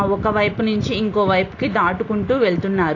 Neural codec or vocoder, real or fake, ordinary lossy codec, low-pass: none; real; AAC, 32 kbps; 7.2 kHz